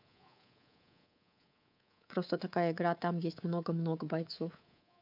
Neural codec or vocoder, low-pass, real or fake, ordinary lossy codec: codec, 24 kHz, 3.1 kbps, DualCodec; 5.4 kHz; fake; none